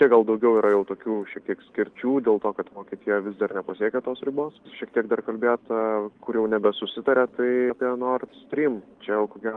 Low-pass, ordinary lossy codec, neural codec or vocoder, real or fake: 9.9 kHz; Opus, 24 kbps; none; real